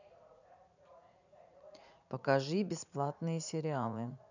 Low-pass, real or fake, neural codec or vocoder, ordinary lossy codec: 7.2 kHz; fake; vocoder, 44.1 kHz, 80 mel bands, Vocos; none